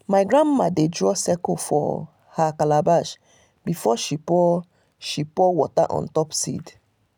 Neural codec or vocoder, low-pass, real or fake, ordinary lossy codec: none; none; real; none